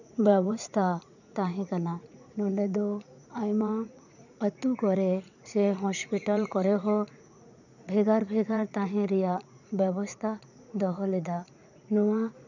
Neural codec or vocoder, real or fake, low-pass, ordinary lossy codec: vocoder, 44.1 kHz, 80 mel bands, Vocos; fake; 7.2 kHz; none